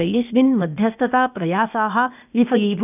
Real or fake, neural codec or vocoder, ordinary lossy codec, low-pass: fake; codec, 16 kHz, 0.8 kbps, ZipCodec; none; 3.6 kHz